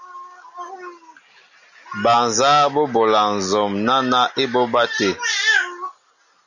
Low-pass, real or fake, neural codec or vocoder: 7.2 kHz; real; none